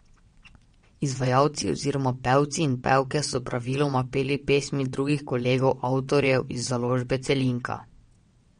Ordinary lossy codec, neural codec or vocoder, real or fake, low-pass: MP3, 48 kbps; vocoder, 22.05 kHz, 80 mel bands, WaveNeXt; fake; 9.9 kHz